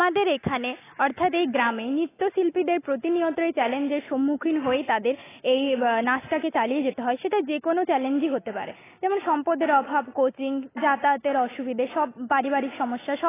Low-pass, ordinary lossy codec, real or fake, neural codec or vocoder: 3.6 kHz; AAC, 16 kbps; real; none